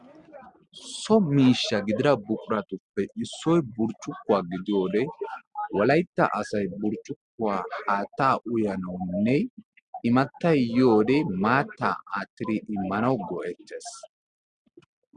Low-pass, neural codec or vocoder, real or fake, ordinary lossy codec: 9.9 kHz; none; real; Opus, 64 kbps